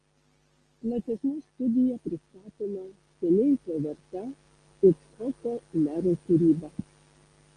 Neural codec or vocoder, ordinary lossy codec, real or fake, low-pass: none; Opus, 24 kbps; real; 9.9 kHz